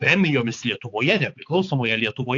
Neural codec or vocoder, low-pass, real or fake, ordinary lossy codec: codec, 16 kHz, 4 kbps, X-Codec, HuBERT features, trained on balanced general audio; 7.2 kHz; fake; AAC, 48 kbps